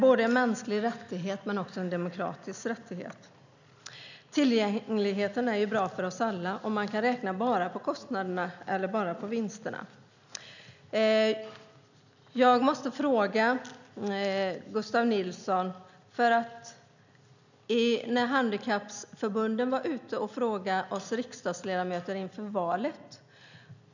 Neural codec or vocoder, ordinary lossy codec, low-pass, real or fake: none; none; 7.2 kHz; real